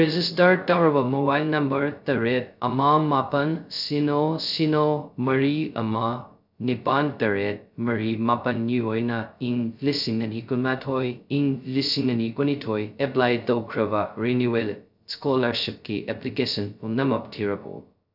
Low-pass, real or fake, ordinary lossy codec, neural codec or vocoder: 5.4 kHz; fake; none; codec, 16 kHz, 0.2 kbps, FocalCodec